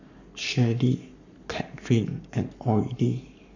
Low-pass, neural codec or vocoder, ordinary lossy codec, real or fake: 7.2 kHz; codec, 44.1 kHz, 7.8 kbps, Pupu-Codec; none; fake